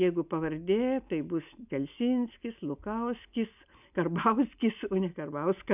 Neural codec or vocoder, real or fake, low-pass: none; real; 3.6 kHz